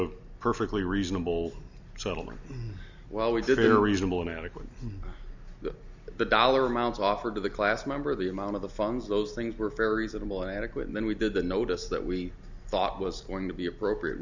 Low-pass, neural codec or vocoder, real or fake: 7.2 kHz; none; real